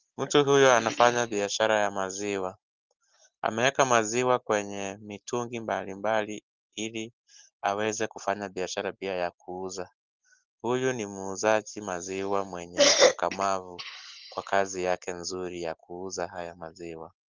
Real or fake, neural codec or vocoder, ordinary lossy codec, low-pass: real; none; Opus, 16 kbps; 7.2 kHz